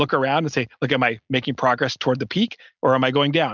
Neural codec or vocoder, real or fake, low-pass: none; real; 7.2 kHz